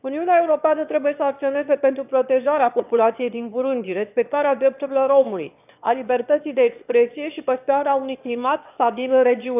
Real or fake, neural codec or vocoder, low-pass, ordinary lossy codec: fake; autoencoder, 22.05 kHz, a latent of 192 numbers a frame, VITS, trained on one speaker; 3.6 kHz; none